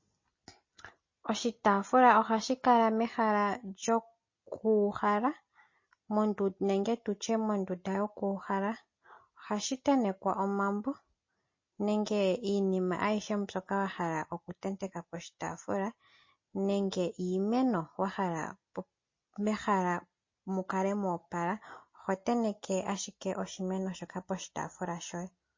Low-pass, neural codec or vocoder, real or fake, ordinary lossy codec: 7.2 kHz; none; real; MP3, 32 kbps